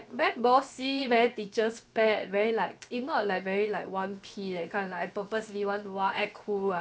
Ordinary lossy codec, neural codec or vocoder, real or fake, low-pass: none; codec, 16 kHz, 0.7 kbps, FocalCodec; fake; none